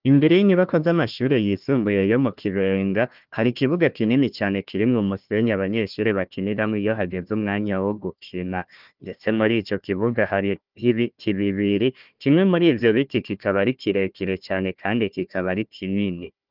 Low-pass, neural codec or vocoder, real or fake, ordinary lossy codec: 5.4 kHz; codec, 16 kHz, 1 kbps, FunCodec, trained on Chinese and English, 50 frames a second; fake; Opus, 32 kbps